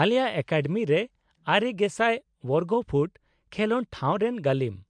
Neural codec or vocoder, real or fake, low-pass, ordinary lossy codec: none; real; 9.9 kHz; MP3, 64 kbps